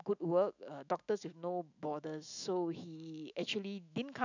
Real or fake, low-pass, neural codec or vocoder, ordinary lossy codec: fake; 7.2 kHz; autoencoder, 48 kHz, 128 numbers a frame, DAC-VAE, trained on Japanese speech; none